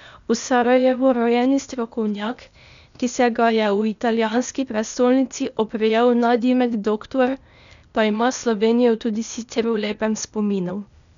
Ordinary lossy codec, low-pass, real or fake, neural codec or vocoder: none; 7.2 kHz; fake; codec, 16 kHz, 0.8 kbps, ZipCodec